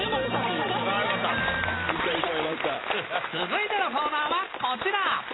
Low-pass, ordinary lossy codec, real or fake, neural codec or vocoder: 7.2 kHz; AAC, 16 kbps; real; none